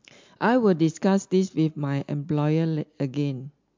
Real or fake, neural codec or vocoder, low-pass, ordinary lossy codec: real; none; 7.2 kHz; MP3, 64 kbps